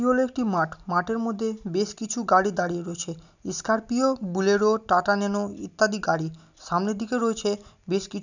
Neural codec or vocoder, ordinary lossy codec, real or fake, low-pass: none; none; real; 7.2 kHz